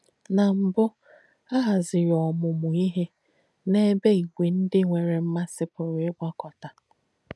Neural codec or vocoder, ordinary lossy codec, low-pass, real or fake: none; none; none; real